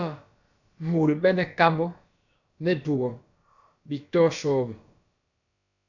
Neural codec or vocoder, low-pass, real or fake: codec, 16 kHz, about 1 kbps, DyCAST, with the encoder's durations; 7.2 kHz; fake